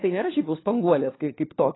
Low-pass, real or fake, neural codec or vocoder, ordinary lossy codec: 7.2 kHz; fake; codec, 16 kHz, 4 kbps, FunCodec, trained on LibriTTS, 50 frames a second; AAC, 16 kbps